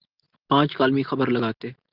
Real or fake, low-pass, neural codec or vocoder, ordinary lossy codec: real; 5.4 kHz; none; Opus, 24 kbps